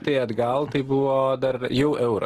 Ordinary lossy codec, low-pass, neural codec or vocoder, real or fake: Opus, 16 kbps; 14.4 kHz; none; real